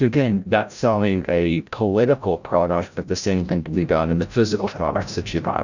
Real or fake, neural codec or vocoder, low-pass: fake; codec, 16 kHz, 0.5 kbps, FreqCodec, larger model; 7.2 kHz